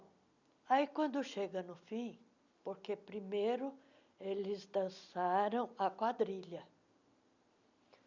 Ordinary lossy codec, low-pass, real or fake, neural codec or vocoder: none; 7.2 kHz; real; none